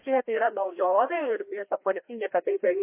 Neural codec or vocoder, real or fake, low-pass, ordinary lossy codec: codec, 16 kHz, 1 kbps, FreqCodec, larger model; fake; 3.6 kHz; MP3, 24 kbps